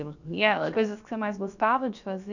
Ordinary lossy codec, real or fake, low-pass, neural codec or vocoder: none; fake; 7.2 kHz; codec, 16 kHz, about 1 kbps, DyCAST, with the encoder's durations